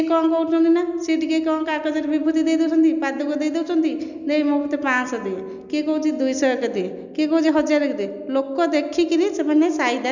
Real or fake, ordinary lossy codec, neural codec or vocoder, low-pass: real; none; none; 7.2 kHz